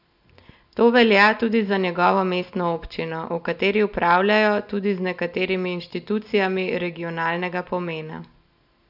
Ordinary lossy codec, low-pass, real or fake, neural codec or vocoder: none; 5.4 kHz; real; none